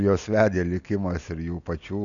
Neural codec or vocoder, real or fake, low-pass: none; real; 7.2 kHz